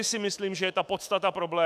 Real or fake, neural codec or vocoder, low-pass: fake; autoencoder, 48 kHz, 128 numbers a frame, DAC-VAE, trained on Japanese speech; 14.4 kHz